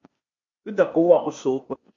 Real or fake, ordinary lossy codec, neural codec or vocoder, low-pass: fake; MP3, 48 kbps; codec, 16 kHz, 0.8 kbps, ZipCodec; 7.2 kHz